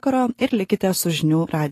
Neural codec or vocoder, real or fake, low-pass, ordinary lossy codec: none; real; 14.4 kHz; AAC, 48 kbps